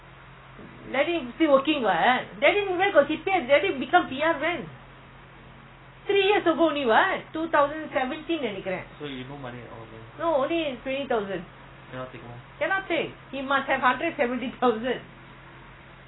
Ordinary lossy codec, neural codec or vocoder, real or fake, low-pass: AAC, 16 kbps; none; real; 7.2 kHz